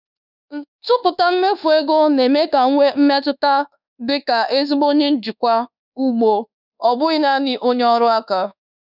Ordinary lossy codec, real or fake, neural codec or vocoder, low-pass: none; fake; codec, 24 kHz, 1.2 kbps, DualCodec; 5.4 kHz